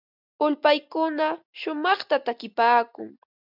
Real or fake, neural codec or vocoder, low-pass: real; none; 5.4 kHz